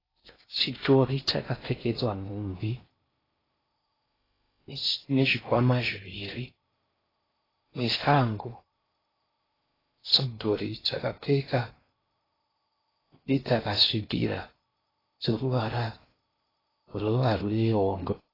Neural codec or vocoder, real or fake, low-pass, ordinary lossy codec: codec, 16 kHz in and 24 kHz out, 0.6 kbps, FocalCodec, streaming, 4096 codes; fake; 5.4 kHz; AAC, 24 kbps